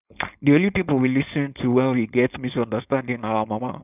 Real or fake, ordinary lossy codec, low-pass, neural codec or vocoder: fake; none; 3.6 kHz; codec, 16 kHz, 4.8 kbps, FACodec